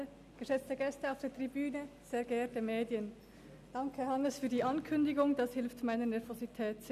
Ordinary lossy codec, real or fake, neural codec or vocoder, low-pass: none; real; none; 14.4 kHz